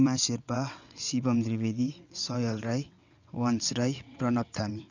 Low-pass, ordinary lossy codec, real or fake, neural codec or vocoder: 7.2 kHz; none; fake; vocoder, 22.05 kHz, 80 mel bands, WaveNeXt